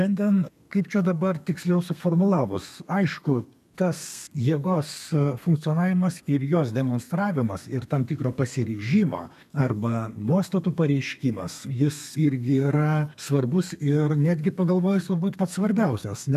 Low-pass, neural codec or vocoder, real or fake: 14.4 kHz; codec, 32 kHz, 1.9 kbps, SNAC; fake